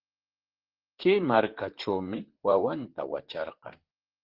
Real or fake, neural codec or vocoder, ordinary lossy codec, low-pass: fake; vocoder, 44.1 kHz, 128 mel bands, Pupu-Vocoder; Opus, 16 kbps; 5.4 kHz